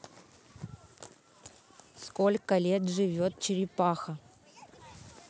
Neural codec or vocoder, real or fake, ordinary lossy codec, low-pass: none; real; none; none